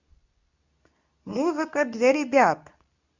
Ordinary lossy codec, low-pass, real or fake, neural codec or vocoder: none; 7.2 kHz; fake; codec, 24 kHz, 0.9 kbps, WavTokenizer, medium speech release version 2